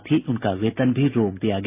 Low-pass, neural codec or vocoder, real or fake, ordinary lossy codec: 3.6 kHz; none; real; AAC, 32 kbps